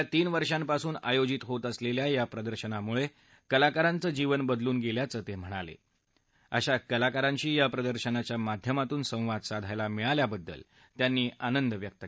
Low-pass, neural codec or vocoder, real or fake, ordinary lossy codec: none; none; real; none